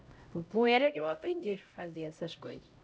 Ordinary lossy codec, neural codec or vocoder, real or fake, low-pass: none; codec, 16 kHz, 0.5 kbps, X-Codec, HuBERT features, trained on LibriSpeech; fake; none